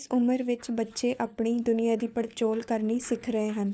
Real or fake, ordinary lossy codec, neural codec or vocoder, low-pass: fake; none; codec, 16 kHz, 4 kbps, FunCodec, trained on LibriTTS, 50 frames a second; none